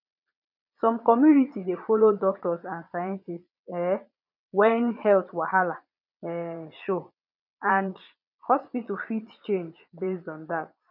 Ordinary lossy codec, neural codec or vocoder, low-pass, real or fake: none; vocoder, 22.05 kHz, 80 mel bands, Vocos; 5.4 kHz; fake